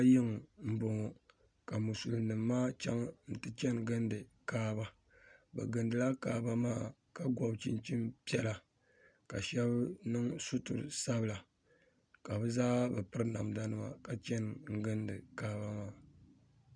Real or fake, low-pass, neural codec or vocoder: real; 9.9 kHz; none